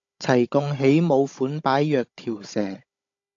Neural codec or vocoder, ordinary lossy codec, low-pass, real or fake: codec, 16 kHz, 16 kbps, FunCodec, trained on Chinese and English, 50 frames a second; AAC, 48 kbps; 7.2 kHz; fake